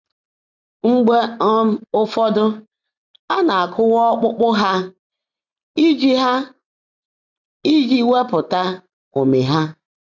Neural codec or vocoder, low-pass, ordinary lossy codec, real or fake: none; 7.2 kHz; none; real